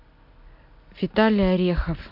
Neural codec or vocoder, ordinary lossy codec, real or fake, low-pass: none; MP3, 48 kbps; real; 5.4 kHz